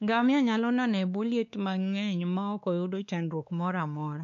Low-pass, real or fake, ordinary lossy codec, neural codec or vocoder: 7.2 kHz; fake; none; codec, 16 kHz, 2 kbps, X-Codec, WavLM features, trained on Multilingual LibriSpeech